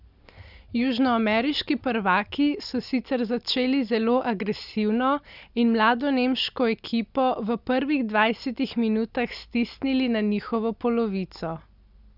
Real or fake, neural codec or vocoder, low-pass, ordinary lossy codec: real; none; 5.4 kHz; none